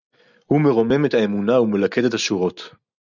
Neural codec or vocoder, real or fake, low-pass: none; real; 7.2 kHz